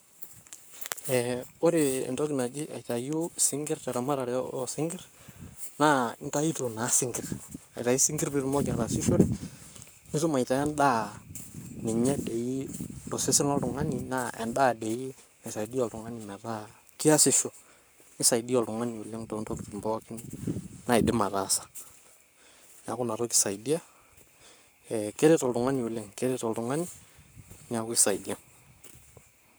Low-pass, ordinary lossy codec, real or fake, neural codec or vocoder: none; none; fake; codec, 44.1 kHz, 7.8 kbps, Pupu-Codec